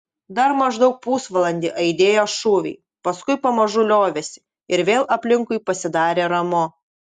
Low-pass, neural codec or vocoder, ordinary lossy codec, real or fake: 10.8 kHz; none; Opus, 64 kbps; real